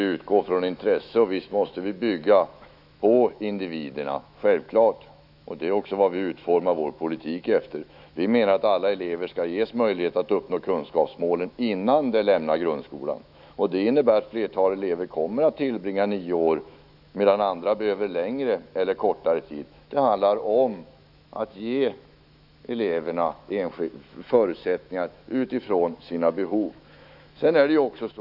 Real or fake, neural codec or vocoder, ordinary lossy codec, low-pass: fake; autoencoder, 48 kHz, 128 numbers a frame, DAC-VAE, trained on Japanese speech; none; 5.4 kHz